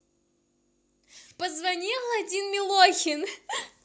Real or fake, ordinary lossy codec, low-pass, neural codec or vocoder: real; none; none; none